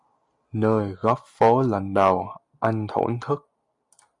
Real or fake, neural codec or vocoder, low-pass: real; none; 10.8 kHz